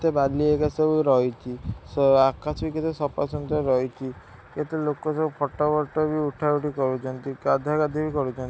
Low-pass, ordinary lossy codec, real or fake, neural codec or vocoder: none; none; real; none